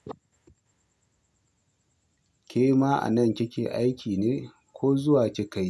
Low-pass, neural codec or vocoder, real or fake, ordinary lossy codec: none; none; real; none